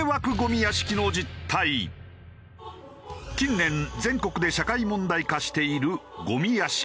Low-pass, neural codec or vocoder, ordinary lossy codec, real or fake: none; none; none; real